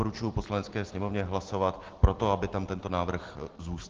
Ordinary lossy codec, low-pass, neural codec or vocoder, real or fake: Opus, 16 kbps; 7.2 kHz; none; real